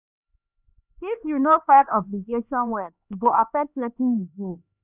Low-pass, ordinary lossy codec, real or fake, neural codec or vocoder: 3.6 kHz; none; fake; codec, 16 kHz, 2 kbps, X-Codec, HuBERT features, trained on LibriSpeech